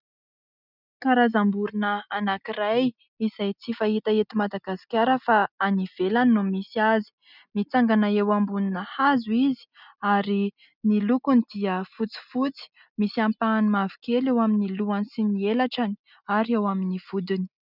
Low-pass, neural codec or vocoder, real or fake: 5.4 kHz; none; real